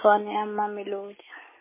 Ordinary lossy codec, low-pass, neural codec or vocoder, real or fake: MP3, 16 kbps; 3.6 kHz; none; real